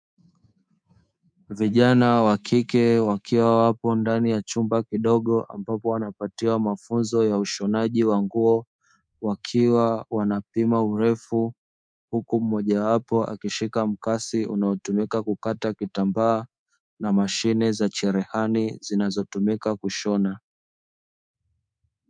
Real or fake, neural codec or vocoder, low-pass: fake; codec, 24 kHz, 3.1 kbps, DualCodec; 9.9 kHz